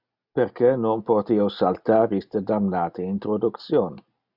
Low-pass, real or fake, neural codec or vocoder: 5.4 kHz; real; none